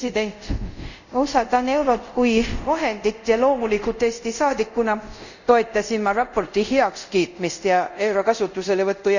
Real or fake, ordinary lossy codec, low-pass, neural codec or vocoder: fake; none; 7.2 kHz; codec, 24 kHz, 0.5 kbps, DualCodec